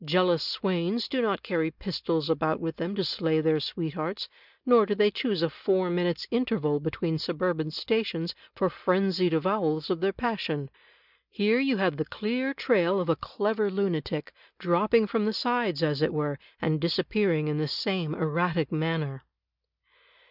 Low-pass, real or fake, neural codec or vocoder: 5.4 kHz; real; none